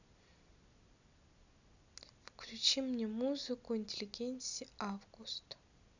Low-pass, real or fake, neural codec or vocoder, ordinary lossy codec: 7.2 kHz; real; none; none